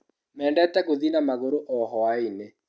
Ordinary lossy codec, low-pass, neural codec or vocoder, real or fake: none; none; none; real